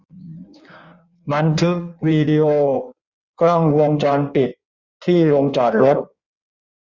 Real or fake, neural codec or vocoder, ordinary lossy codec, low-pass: fake; codec, 16 kHz in and 24 kHz out, 1.1 kbps, FireRedTTS-2 codec; Opus, 64 kbps; 7.2 kHz